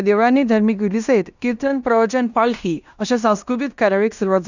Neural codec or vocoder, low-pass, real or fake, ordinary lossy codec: codec, 16 kHz in and 24 kHz out, 0.9 kbps, LongCat-Audio-Codec, fine tuned four codebook decoder; 7.2 kHz; fake; none